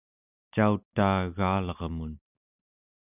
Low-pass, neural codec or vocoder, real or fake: 3.6 kHz; none; real